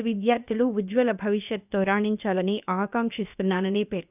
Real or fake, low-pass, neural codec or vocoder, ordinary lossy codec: fake; 3.6 kHz; codec, 16 kHz, about 1 kbps, DyCAST, with the encoder's durations; none